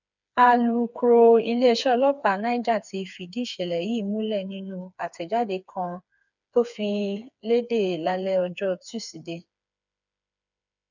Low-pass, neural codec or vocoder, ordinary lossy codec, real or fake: 7.2 kHz; codec, 16 kHz, 4 kbps, FreqCodec, smaller model; none; fake